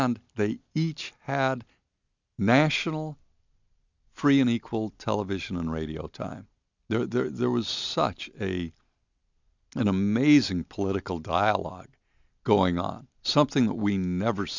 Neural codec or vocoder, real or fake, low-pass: none; real; 7.2 kHz